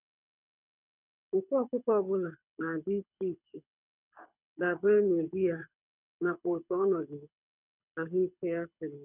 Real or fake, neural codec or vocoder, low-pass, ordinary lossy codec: fake; codec, 24 kHz, 6 kbps, HILCodec; 3.6 kHz; none